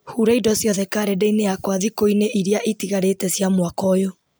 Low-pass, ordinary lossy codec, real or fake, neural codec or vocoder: none; none; real; none